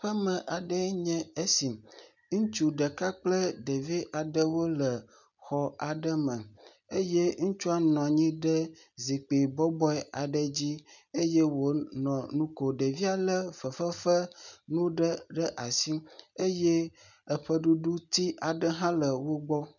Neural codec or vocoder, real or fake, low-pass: none; real; 7.2 kHz